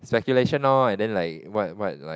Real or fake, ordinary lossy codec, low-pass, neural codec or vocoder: real; none; none; none